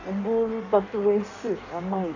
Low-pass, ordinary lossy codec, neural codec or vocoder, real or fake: 7.2 kHz; none; codec, 16 kHz in and 24 kHz out, 1.1 kbps, FireRedTTS-2 codec; fake